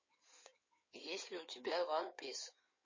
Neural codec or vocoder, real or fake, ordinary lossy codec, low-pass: codec, 16 kHz in and 24 kHz out, 2.2 kbps, FireRedTTS-2 codec; fake; MP3, 32 kbps; 7.2 kHz